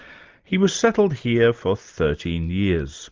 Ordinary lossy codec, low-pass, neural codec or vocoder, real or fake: Opus, 24 kbps; 7.2 kHz; none; real